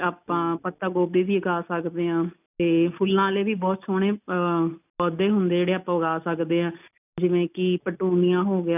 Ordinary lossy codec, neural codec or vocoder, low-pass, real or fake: none; none; 3.6 kHz; real